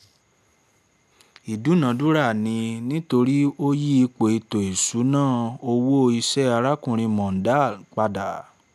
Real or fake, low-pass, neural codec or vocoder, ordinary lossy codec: real; 14.4 kHz; none; none